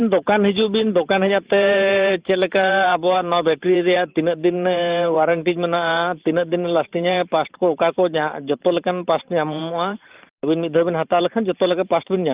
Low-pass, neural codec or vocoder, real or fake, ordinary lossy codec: 3.6 kHz; vocoder, 44.1 kHz, 128 mel bands every 512 samples, BigVGAN v2; fake; Opus, 32 kbps